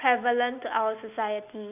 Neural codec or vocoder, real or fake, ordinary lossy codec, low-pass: none; real; none; 3.6 kHz